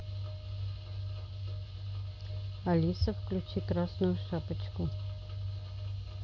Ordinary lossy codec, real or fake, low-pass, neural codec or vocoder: none; real; 7.2 kHz; none